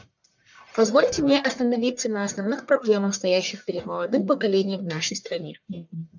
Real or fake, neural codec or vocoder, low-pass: fake; codec, 44.1 kHz, 1.7 kbps, Pupu-Codec; 7.2 kHz